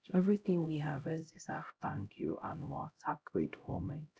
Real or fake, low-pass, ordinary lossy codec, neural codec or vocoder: fake; none; none; codec, 16 kHz, 0.5 kbps, X-Codec, HuBERT features, trained on LibriSpeech